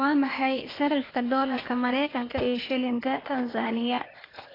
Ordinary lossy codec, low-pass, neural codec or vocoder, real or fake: AAC, 24 kbps; 5.4 kHz; codec, 16 kHz, 0.8 kbps, ZipCodec; fake